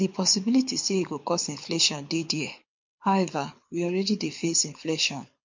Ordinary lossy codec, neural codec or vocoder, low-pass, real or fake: MP3, 48 kbps; codec, 24 kHz, 6 kbps, HILCodec; 7.2 kHz; fake